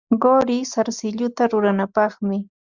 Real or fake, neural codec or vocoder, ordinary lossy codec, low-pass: real; none; Opus, 64 kbps; 7.2 kHz